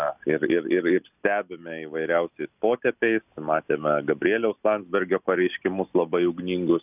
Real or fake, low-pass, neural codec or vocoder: real; 3.6 kHz; none